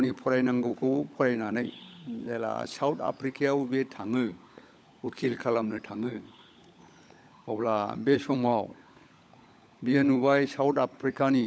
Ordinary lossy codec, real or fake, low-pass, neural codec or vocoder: none; fake; none; codec, 16 kHz, 16 kbps, FunCodec, trained on LibriTTS, 50 frames a second